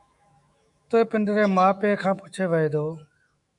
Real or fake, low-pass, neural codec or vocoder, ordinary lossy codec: fake; 10.8 kHz; autoencoder, 48 kHz, 128 numbers a frame, DAC-VAE, trained on Japanese speech; MP3, 96 kbps